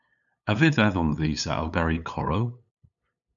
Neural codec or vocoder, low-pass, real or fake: codec, 16 kHz, 8 kbps, FunCodec, trained on LibriTTS, 25 frames a second; 7.2 kHz; fake